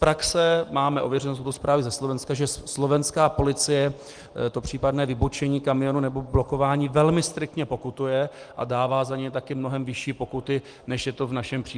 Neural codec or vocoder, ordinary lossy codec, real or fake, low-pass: none; Opus, 24 kbps; real; 9.9 kHz